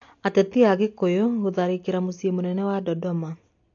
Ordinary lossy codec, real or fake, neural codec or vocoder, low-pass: AAC, 48 kbps; real; none; 7.2 kHz